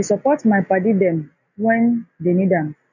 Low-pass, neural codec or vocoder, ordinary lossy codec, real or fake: 7.2 kHz; none; none; real